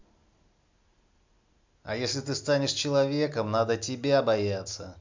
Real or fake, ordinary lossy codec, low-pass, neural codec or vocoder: real; MP3, 64 kbps; 7.2 kHz; none